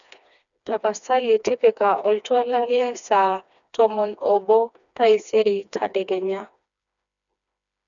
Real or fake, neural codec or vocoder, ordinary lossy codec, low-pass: fake; codec, 16 kHz, 2 kbps, FreqCodec, smaller model; none; 7.2 kHz